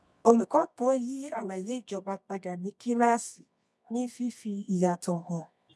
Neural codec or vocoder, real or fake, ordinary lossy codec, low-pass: codec, 24 kHz, 0.9 kbps, WavTokenizer, medium music audio release; fake; none; none